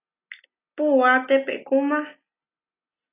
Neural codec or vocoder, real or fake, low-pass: none; real; 3.6 kHz